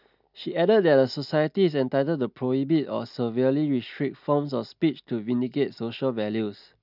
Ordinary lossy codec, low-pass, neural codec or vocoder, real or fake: none; 5.4 kHz; none; real